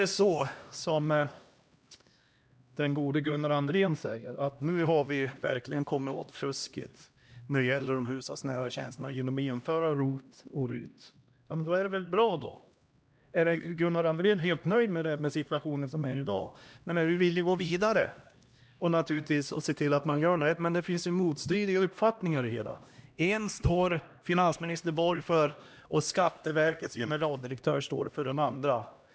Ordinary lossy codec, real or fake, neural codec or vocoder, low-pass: none; fake; codec, 16 kHz, 1 kbps, X-Codec, HuBERT features, trained on LibriSpeech; none